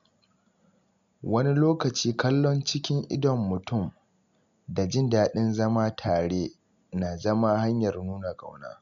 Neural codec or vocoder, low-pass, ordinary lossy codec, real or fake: none; 7.2 kHz; MP3, 64 kbps; real